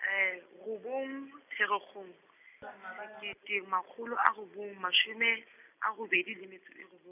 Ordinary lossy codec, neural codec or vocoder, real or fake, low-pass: none; none; real; 3.6 kHz